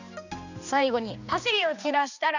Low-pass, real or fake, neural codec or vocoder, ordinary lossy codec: 7.2 kHz; fake; codec, 16 kHz, 2 kbps, X-Codec, HuBERT features, trained on balanced general audio; none